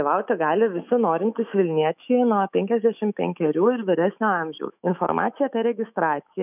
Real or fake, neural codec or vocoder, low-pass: fake; autoencoder, 48 kHz, 128 numbers a frame, DAC-VAE, trained on Japanese speech; 3.6 kHz